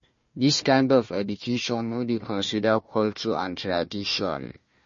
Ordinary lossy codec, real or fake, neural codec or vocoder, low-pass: MP3, 32 kbps; fake; codec, 16 kHz, 1 kbps, FunCodec, trained on Chinese and English, 50 frames a second; 7.2 kHz